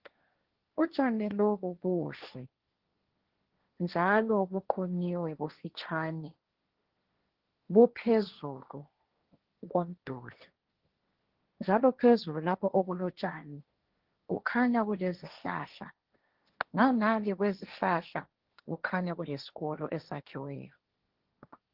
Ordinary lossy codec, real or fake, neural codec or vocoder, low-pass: Opus, 16 kbps; fake; codec, 16 kHz, 1.1 kbps, Voila-Tokenizer; 5.4 kHz